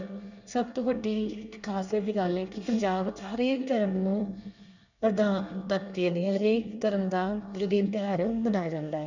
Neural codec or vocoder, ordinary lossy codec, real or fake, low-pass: codec, 24 kHz, 1 kbps, SNAC; none; fake; 7.2 kHz